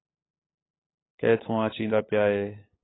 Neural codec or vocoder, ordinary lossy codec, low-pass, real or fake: codec, 16 kHz, 8 kbps, FunCodec, trained on LibriTTS, 25 frames a second; AAC, 16 kbps; 7.2 kHz; fake